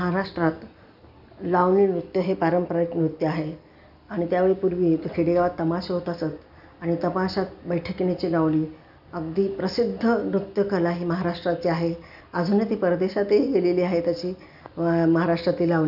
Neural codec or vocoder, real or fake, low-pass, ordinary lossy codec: none; real; 5.4 kHz; none